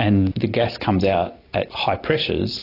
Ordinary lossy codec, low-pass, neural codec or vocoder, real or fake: AAC, 32 kbps; 5.4 kHz; none; real